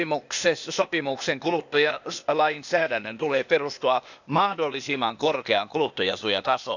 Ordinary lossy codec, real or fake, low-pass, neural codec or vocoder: none; fake; 7.2 kHz; codec, 16 kHz, 0.8 kbps, ZipCodec